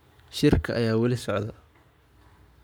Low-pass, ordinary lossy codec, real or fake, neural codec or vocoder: none; none; fake; codec, 44.1 kHz, 7.8 kbps, Pupu-Codec